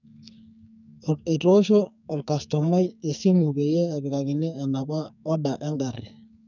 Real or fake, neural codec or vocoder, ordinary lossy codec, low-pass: fake; codec, 44.1 kHz, 2.6 kbps, SNAC; none; 7.2 kHz